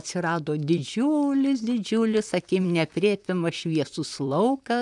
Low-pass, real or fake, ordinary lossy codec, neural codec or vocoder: 10.8 kHz; fake; MP3, 96 kbps; vocoder, 44.1 kHz, 128 mel bands, Pupu-Vocoder